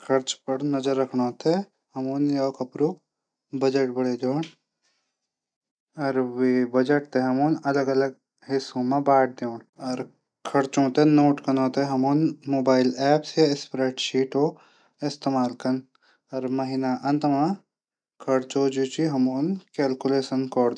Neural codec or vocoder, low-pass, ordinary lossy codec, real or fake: none; 9.9 kHz; none; real